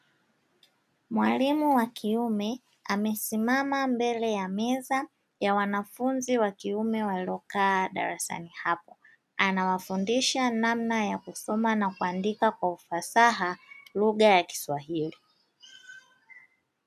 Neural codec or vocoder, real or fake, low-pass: none; real; 14.4 kHz